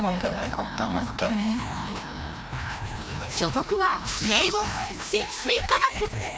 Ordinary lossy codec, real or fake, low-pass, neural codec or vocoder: none; fake; none; codec, 16 kHz, 1 kbps, FreqCodec, larger model